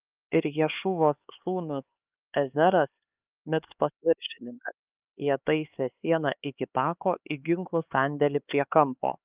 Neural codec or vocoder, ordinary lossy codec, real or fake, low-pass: codec, 16 kHz, 4 kbps, X-Codec, HuBERT features, trained on LibriSpeech; Opus, 24 kbps; fake; 3.6 kHz